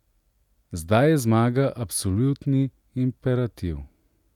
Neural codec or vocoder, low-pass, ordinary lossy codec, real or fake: none; 19.8 kHz; none; real